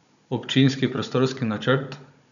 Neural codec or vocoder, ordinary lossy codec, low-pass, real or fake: codec, 16 kHz, 16 kbps, FunCodec, trained on Chinese and English, 50 frames a second; none; 7.2 kHz; fake